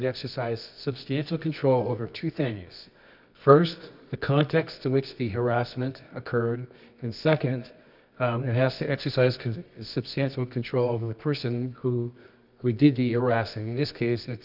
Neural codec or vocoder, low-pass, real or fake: codec, 24 kHz, 0.9 kbps, WavTokenizer, medium music audio release; 5.4 kHz; fake